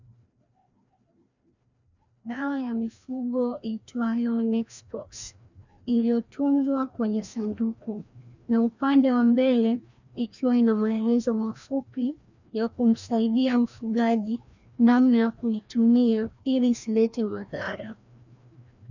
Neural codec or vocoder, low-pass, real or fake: codec, 16 kHz, 1 kbps, FreqCodec, larger model; 7.2 kHz; fake